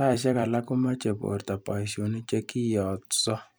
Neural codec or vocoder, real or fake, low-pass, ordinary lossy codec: vocoder, 44.1 kHz, 128 mel bands every 256 samples, BigVGAN v2; fake; none; none